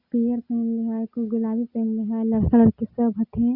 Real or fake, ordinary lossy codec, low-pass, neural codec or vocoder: real; Opus, 64 kbps; 5.4 kHz; none